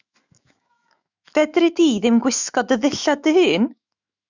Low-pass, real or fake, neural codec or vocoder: 7.2 kHz; fake; autoencoder, 48 kHz, 128 numbers a frame, DAC-VAE, trained on Japanese speech